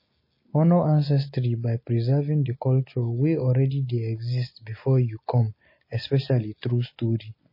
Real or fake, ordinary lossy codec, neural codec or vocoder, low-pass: real; MP3, 24 kbps; none; 5.4 kHz